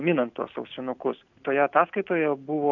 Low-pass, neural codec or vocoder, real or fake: 7.2 kHz; none; real